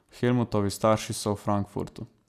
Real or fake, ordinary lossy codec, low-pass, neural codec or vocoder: real; none; 14.4 kHz; none